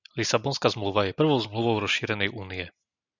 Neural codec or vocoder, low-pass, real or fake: none; 7.2 kHz; real